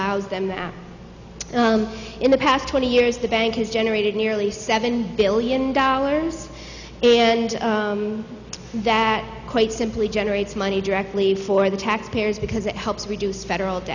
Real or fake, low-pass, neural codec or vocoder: real; 7.2 kHz; none